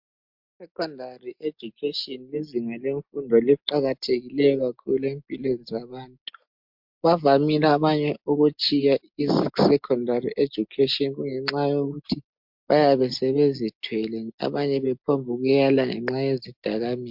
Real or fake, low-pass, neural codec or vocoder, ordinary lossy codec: real; 5.4 kHz; none; MP3, 48 kbps